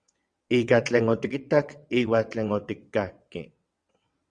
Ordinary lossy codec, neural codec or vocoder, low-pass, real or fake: Opus, 64 kbps; vocoder, 22.05 kHz, 80 mel bands, WaveNeXt; 9.9 kHz; fake